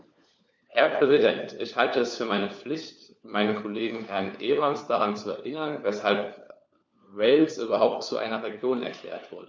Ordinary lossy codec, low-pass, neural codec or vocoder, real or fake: none; none; codec, 16 kHz, 4 kbps, FunCodec, trained on LibriTTS, 50 frames a second; fake